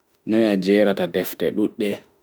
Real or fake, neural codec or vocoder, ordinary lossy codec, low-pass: fake; autoencoder, 48 kHz, 32 numbers a frame, DAC-VAE, trained on Japanese speech; none; none